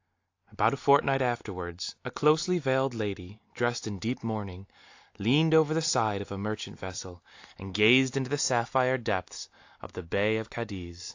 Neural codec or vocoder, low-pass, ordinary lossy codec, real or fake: none; 7.2 kHz; AAC, 48 kbps; real